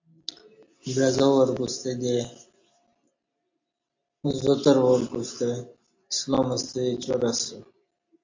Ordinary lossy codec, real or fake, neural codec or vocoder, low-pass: AAC, 48 kbps; real; none; 7.2 kHz